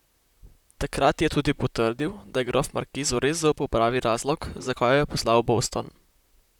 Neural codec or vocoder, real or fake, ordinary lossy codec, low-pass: vocoder, 44.1 kHz, 128 mel bands, Pupu-Vocoder; fake; none; 19.8 kHz